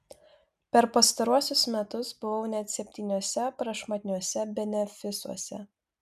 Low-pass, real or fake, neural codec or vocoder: 14.4 kHz; real; none